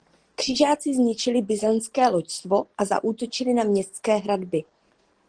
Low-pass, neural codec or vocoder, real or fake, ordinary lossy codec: 9.9 kHz; none; real; Opus, 16 kbps